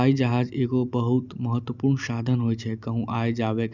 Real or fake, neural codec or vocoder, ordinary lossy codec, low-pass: real; none; none; 7.2 kHz